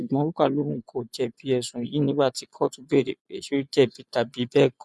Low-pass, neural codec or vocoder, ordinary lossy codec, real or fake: none; vocoder, 24 kHz, 100 mel bands, Vocos; none; fake